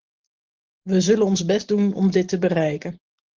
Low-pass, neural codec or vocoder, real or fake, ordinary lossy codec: 7.2 kHz; none; real; Opus, 16 kbps